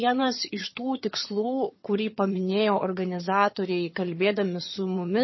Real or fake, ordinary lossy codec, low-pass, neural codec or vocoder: fake; MP3, 24 kbps; 7.2 kHz; vocoder, 22.05 kHz, 80 mel bands, HiFi-GAN